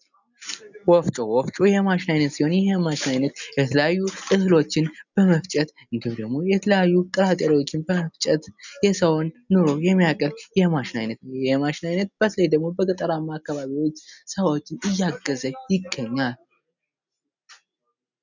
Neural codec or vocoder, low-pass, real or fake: none; 7.2 kHz; real